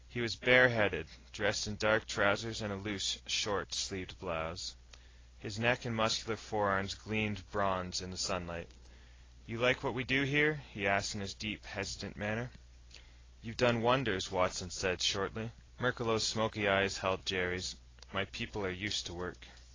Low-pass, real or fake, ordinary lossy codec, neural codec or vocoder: 7.2 kHz; real; AAC, 32 kbps; none